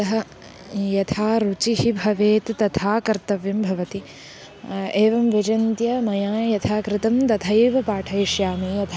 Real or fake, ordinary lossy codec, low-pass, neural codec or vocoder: real; none; none; none